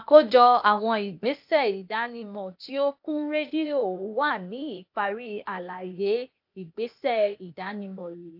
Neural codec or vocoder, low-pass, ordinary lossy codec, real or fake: codec, 16 kHz, 0.8 kbps, ZipCodec; 5.4 kHz; AAC, 48 kbps; fake